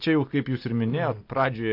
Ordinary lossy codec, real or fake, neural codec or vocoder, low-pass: AAC, 32 kbps; real; none; 5.4 kHz